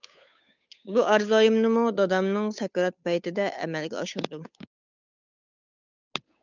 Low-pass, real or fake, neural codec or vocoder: 7.2 kHz; fake; codec, 16 kHz, 8 kbps, FunCodec, trained on Chinese and English, 25 frames a second